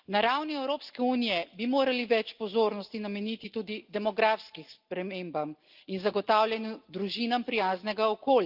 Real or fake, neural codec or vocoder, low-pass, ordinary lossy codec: real; none; 5.4 kHz; Opus, 32 kbps